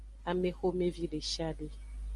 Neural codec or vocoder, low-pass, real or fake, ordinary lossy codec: none; 10.8 kHz; real; Opus, 32 kbps